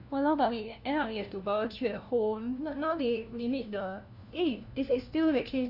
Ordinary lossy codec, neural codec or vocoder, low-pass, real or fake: none; codec, 16 kHz, 1 kbps, FunCodec, trained on LibriTTS, 50 frames a second; 5.4 kHz; fake